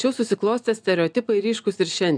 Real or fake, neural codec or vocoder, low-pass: real; none; 9.9 kHz